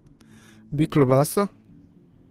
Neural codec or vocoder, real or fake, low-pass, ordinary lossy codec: codec, 32 kHz, 1.9 kbps, SNAC; fake; 14.4 kHz; Opus, 16 kbps